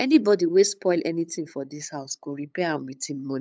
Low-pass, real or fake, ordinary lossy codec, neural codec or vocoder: none; fake; none; codec, 16 kHz, 8 kbps, FunCodec, trained on LibriTTS, 25 frames a second